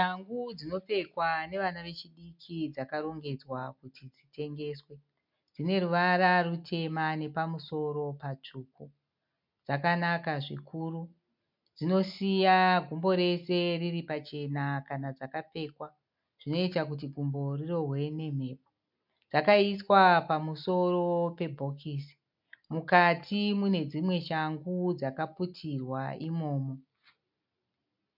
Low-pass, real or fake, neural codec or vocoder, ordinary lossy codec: 5.4 kHz; real; none; MP3, 48 kbps